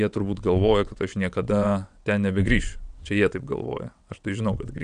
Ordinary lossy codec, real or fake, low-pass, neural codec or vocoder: MP3, 96 kbps; fake; 9.9 kHz; vocoder, 22.05 kHz, 80 mel bands, WaveNeXt